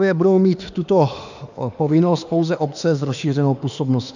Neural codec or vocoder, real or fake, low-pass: autoencoder, 48 kHz, 32 numbers a frame, DAC-VAE, trained on Japanese speech; fake; 7.2 kHz